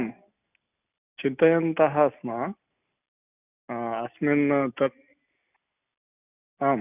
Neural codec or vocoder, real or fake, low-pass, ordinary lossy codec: none; real; 3.6 kHz; none